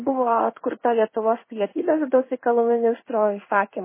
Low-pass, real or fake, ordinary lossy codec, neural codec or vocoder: 3.6 kHz; fake; MP3, 16 kbps; codec, 16 kHz in and 24 kHz out, 1 kbps, XY-Tokenizer